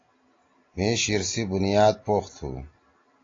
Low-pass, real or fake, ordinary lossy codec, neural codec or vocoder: 7.2 kHz; real; AAC, 32 kbps; none